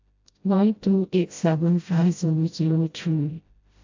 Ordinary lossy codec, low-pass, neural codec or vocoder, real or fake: AAC, 48 kbps; 7.2 kHz; codec, 16 kHz, 0.5 kbps, FreqCodec, smaller model; fake